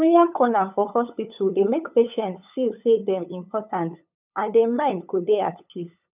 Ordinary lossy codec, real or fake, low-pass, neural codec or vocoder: none; fake; 3.6 kHz; codec, 16 kHz, 8 kbps, FunCodec, trained on LibriTTS, 25 frames a second